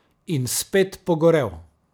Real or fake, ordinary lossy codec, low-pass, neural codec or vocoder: real; none; none; none